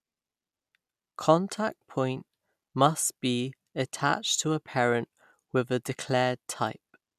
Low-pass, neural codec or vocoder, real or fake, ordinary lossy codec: 14.4 kHz; none; real; none